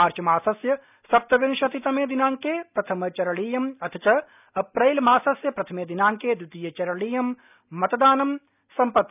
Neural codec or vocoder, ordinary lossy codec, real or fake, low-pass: none; none; real; 3.6 kHz